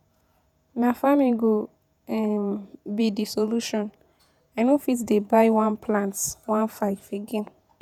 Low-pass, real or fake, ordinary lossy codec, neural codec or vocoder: 19.8 kHz; fake; none; vocoder, 48 kHz, 128 mel bands, Vocos